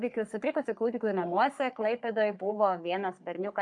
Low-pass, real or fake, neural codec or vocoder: 10.8 kHz; fake; codec, 44.1 kHz, 3.4 kbps, Pupu-Codec